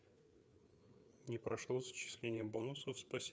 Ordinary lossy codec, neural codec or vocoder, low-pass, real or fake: none; codec, 16 kHz, 4 kbps, FreqCodec, larger model; none; fake